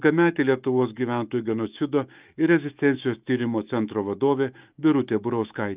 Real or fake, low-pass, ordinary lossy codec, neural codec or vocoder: fake; 3.6 kHz; Opus, 32 kbps; autoencoder, 48 kHz, 128 numbers a frame, DAC-VAE, trained on Japanese speech